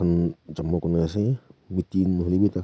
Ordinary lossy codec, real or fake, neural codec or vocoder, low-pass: none; real; none; none